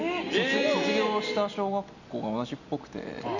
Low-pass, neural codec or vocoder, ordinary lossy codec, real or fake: 7.2 kHz; none; none; real